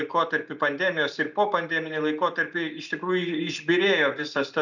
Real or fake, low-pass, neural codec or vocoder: real; 7.2 kHz; none